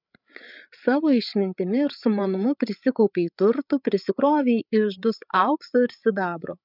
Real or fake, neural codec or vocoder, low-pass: fake; codec, 16 kHz, 8 kbps, FreqCodec, larger model; 5.4 kHz